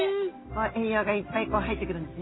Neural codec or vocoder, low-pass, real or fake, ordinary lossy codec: none; 7.2 kHz; real; AAC, 16 kbps